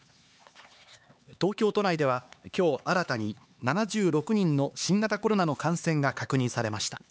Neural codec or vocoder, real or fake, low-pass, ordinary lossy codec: codec, 16 kHz, 4 kbps, X-Codec, HuBERT features, trained on LibriSpeech; fake; none; none